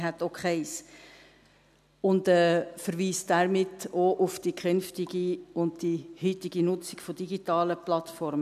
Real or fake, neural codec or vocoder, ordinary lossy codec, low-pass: real; none; none; 14.4 kHz